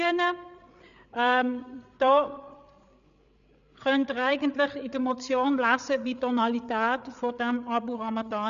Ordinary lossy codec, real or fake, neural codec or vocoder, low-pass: MP3, 96 kbps; fake; codec, 16 kHz, 8 kbps, FreqCodec, larger model; 7.2 kHz